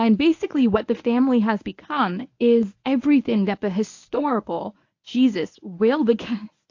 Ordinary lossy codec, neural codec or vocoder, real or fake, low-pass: AAC, 48 kbps; codec, 24 kHz, 0.9 kbps, WavTokenizer, medium speech release version 1; fake; 7.2 kHz